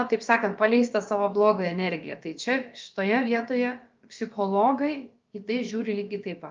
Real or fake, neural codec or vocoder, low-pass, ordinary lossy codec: fake; codec, 16 kHz, about 1 kbps, DyCAST, with the encoder's durations; 7.2 kHz; Opus, 24 kbps